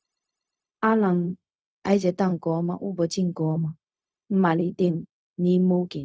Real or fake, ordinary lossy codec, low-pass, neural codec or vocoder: fake; none; none; codec, 16 kHz, 0.4 kbps, LongCat-Audio-Codec